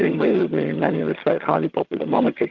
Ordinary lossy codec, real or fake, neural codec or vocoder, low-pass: Opus, 32 kbps; fake; vocoder, 22.05 kHz, 80 mel bands, HiFi-GAN; 7.2 kHz